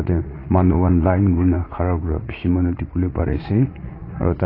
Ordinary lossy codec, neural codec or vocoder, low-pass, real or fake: AAC, 24 kbps; vocoder, 44.1 kHz, 80 mel bands, Vocos; 5.4 kHz; fake